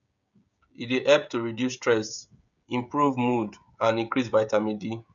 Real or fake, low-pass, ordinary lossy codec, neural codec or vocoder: fake; 7.2 kHz; none; codec, 16 kHz, 16 kbps, FreqCodec, smaller model